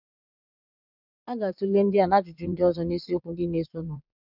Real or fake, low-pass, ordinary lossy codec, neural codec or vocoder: fake; 5.4 kHz; none; vocoder, 22.05 kHz, 80 mel bands, Vocos